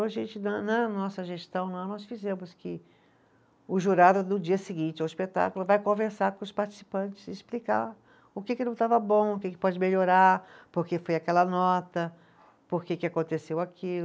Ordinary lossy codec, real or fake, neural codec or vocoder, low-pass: none; real; none; none